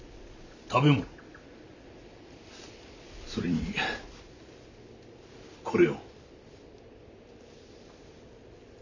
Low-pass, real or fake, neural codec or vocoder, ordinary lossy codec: 7.2 kHz; real; none; none